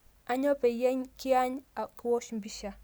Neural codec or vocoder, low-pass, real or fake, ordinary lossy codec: none; none; real; none